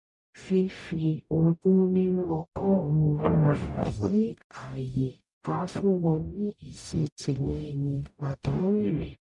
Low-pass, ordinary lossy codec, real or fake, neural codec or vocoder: 10.8 kHz; none; fake; codec, 44.1 kHz, 0.9 kbps, DAC